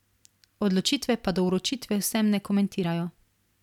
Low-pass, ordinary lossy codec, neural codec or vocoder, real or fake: 19.8 kHz; none; none; real